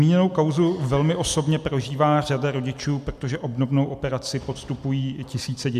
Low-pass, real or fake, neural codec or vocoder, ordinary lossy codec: 14.4 kHz; real; none; MP3, 96 kbps